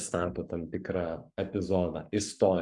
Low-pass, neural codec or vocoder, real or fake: 10.8 kHz; codec, 44.1 kHz, 7.8 kbps, Pupu-Codec; fake